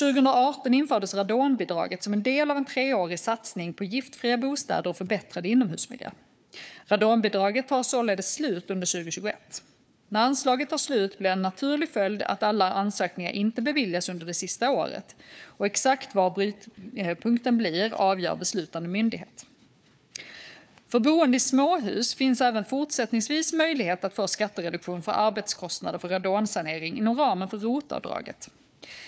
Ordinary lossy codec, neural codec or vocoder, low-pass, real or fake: none; codec, 16 kHz, 4 kbps, FunCodec, trained on Chinese and English, 50 frames a second; none; fake